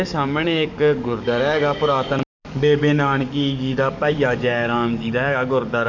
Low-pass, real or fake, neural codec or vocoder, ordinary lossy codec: 7.2 kHz; fake; codec, 44.1 kHz, 7.8 kbps, DAC; none